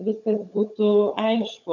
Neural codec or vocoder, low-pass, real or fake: codec, 16 kHz, 16 kbps, FunCodec, trained on LibriTTS, 50 frames a second; 7.2 kHz; fake